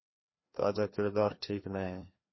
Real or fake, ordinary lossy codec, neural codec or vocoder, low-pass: fake; MP3, 24 kbps; codec, 16 kHz, 2 kbps, FreqCodec, larger model; 7.2 kHz